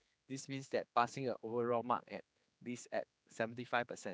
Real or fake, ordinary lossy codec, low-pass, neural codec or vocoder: fake; none; none; codec, 16 kHz, 4 kbps, X-Codec, HuBERT features, trained on general audio